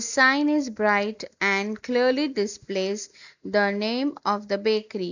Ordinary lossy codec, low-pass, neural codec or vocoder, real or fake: none; 7.2 kHz; none; real